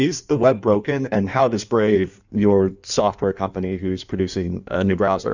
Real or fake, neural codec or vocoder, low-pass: fake; codec, 16 kHz in and 24 kHz out, 1.1 kbps, FireRedTTS-2 codec; 7.2 kHz